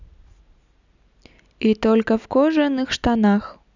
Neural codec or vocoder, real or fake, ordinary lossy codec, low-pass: none; real; none; 7.2 kHz